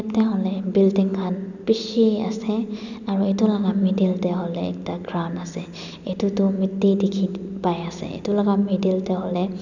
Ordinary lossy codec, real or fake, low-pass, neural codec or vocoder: none; real; 7.2 kHz; none